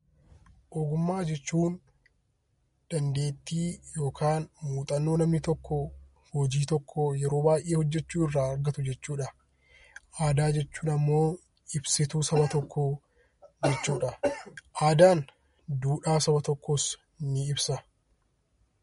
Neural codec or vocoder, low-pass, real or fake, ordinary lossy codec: none; 10.8 kHz; real; MP3, 48 kbps